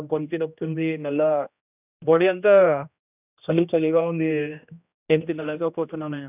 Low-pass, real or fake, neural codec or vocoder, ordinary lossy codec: 3.6 kHz; fake; codec, 16 kHz, 1 kbps, X-Codec, HuBERT features, trained on general audio; none